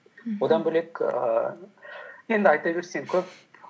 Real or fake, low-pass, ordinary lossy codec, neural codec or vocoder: real; none; none; none